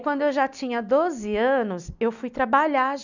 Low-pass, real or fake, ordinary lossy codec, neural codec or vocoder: 7.2 kHz; real; none; none